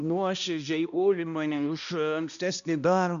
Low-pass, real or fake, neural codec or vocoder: 7.2 kHz; fake; codec, 16 kHz, 1 kbps, X-Codec, HuBERT features, trained on balanced general audio